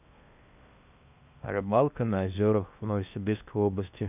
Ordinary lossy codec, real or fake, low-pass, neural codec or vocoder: none; fake; 3.6 kHz; codec, 16 kHz in and 24 kHz out, 0.6 kbps, FocalCodec, streaming, 2048 codes